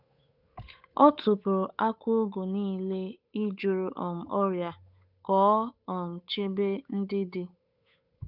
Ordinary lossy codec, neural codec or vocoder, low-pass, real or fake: none; codec, 16 kHz, 8 kbps, FunCodec, trained on Chinese and English, 25 frames a second; 5.4 kHz; fake